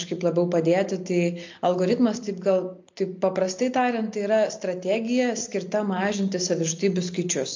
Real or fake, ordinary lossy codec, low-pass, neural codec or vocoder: real; MP3, 48 kbps; 7.2 kHz; none